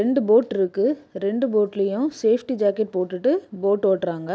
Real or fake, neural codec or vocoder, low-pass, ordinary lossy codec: real; none; none; none